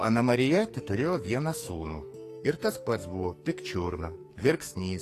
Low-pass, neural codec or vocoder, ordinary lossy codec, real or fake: 14.4 kHz; codec, 44.1 kHz, 2.6 kbps, SNAC; AAC, 48 kbps; fake